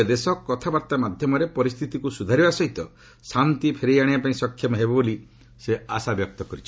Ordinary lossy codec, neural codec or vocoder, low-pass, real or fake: none; none; none; real